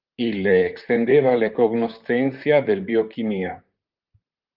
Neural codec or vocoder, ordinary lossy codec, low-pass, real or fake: vocoder, 44.1 kHz, 128 mel bands, Pupu-Vocoder; Opus, 24 kbps; 5.4 kHz; fake